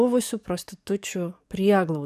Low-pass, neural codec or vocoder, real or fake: 14.4 kHz; codec, 44.1 kHz, 7.8 kbps, DAC; fake